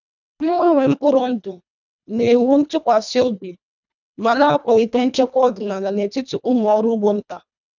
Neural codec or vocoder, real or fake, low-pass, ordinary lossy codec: codec, 24 kHz, 1.5 kbps, HILCodec; fake; 7.2 kHz; none